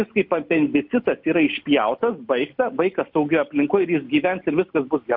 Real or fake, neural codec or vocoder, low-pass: real; none; 5.4 kHz